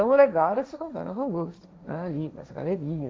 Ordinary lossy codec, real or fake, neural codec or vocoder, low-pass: none; fake; codec, 16 kHz, 1.1 kbps, Voila-Tokenizer; none